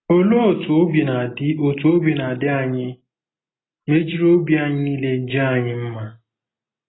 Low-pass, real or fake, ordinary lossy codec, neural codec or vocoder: 7.2 kHz; real; AAC, 16 kbps; none